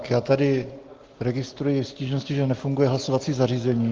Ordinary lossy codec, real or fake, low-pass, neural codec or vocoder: Opus, 16 kbps; real; 7.2 kHz; none